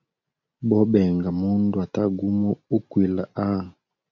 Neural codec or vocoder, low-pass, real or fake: none; 7.2 kHz; real